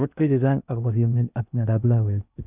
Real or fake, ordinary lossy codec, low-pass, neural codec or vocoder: fake; none; 3.6 kHz; codec, 16 kHz in and 24 kHz out, 0.6 kbps, FocalCodec, streaming, 2048 codes